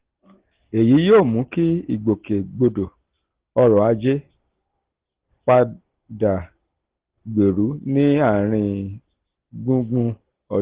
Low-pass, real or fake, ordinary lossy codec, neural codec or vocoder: 3.6 kHz; real; Opus, 16 kbps; none